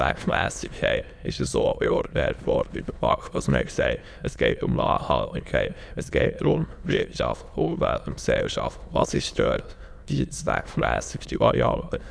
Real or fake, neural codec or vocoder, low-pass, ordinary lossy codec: fake; autoencoder, 22.05 kHz, a latent of 192 numbers a frame, VITS, trained on many speakers; none; none